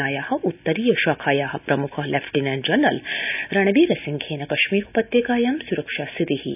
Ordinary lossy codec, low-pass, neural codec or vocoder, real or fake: none; 3.6 kHz; none; real